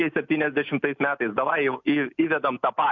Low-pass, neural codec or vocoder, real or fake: 7.2 kHz; none; real